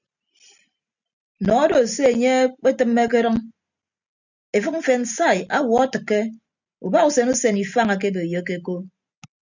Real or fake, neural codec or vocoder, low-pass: real; none; 7.2 kHz